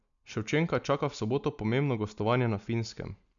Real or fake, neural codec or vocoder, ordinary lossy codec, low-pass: real; none; MP3, 96 kbps; 7.2 kHz